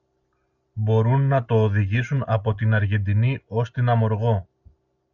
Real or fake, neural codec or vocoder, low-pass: real; none; 7.2 kHz